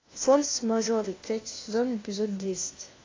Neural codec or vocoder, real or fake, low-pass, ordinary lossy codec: codec, 16 kHz, 1 kbps, FunCodec, trained on LibriTTS, 50 frames a second; fake; 7.2 kHz; AAC, 32 kbps